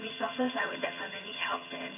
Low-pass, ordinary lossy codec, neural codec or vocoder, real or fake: 3.6 kHz; none; vocoder, 22.05 kHz, 80 mel bands, HiFi-GAN; fake